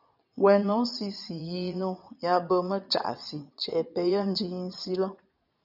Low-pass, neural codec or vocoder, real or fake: 5.4 kHz; vocoder, 22.05 kHz, 80 mel bands, Vocos; fake